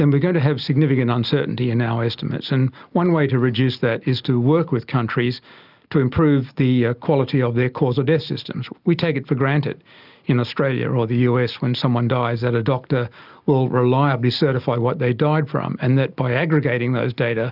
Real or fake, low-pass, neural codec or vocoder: real; 5.4 kHz; none